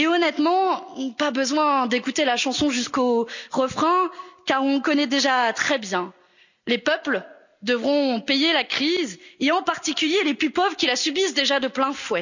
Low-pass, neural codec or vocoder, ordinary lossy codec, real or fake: 7.2 kHz; none; none; real